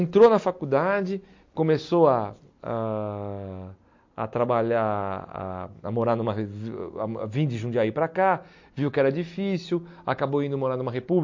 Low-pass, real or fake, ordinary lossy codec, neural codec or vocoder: 7.2 kHz; real; MP3, 48 kbps; none